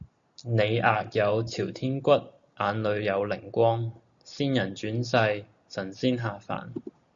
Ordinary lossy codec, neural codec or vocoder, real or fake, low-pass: Opus, 64 kbps; none; real; 7.2 kHz